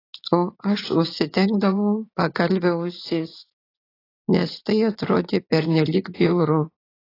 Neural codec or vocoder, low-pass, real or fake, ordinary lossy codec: vocoder, 44.1 kHz, 80 mel bands, Vocos; 5.4 kHz; fake; AAC, 24 kbps